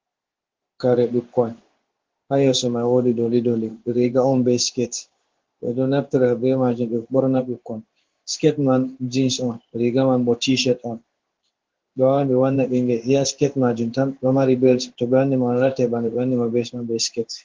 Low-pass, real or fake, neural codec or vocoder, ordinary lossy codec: 7.2 kHz; fake; codec, 16 kHz in and 24 kHz out, 1 kbps, XY-Tokenizer; Opus, 16 kbps